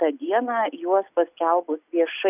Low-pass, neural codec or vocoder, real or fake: 3.6 kHz; none; real